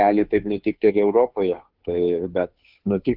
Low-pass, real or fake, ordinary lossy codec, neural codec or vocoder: 5.4 kHz; fake; Opus, 32 kbps; codec, 16 kHz, 2 kbps, FunCodec, trained on Chinese and English, 25 frames a second